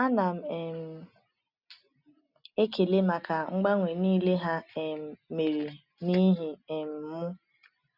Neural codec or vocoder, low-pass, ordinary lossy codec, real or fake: none; 5.4 kHz; none; real